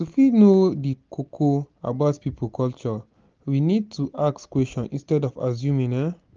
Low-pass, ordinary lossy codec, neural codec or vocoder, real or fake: 7.2 kHz; Opus, 24 kbps; none; real